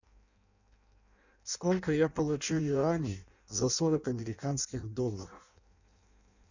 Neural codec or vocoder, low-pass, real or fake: codec, 16 kHz in and 24 kHz out, 0.6 kbps, FireRedTTS-2 codec; 7.2 kHz; fake